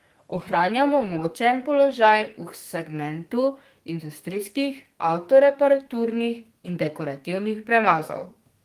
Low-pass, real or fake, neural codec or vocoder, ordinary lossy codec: 14.4 kHz; fake; codec, 32 kHz, 1.9 kbps, SNAC; Opus, 24 kbps